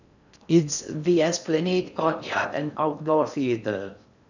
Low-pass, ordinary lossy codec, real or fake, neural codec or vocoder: 7.2 kHz; none; fake; codec, 16 kHz in and 24 kHz out, 0.6 kbps, FocalCodec, streaming, 4096 codes